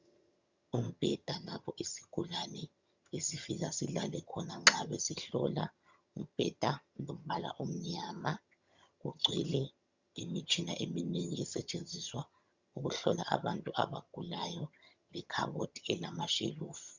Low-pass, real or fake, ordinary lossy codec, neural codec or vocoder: 7.2 kHz; fake; Opus, 64 kbps; vocoder, 22.05 kHz, 80 mel bands, HiFi-GAN